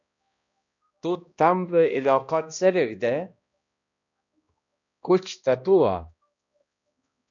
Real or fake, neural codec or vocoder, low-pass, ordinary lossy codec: fake; codec, 16 kHz, 1 kbps, X-Codec, HuBERT features, trained on balanced general audio; 7.2 kHz; MP3, 96 kbps